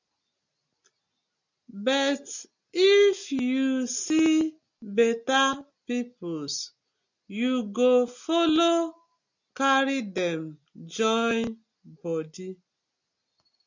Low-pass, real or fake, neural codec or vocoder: 7.2 kHz; real; none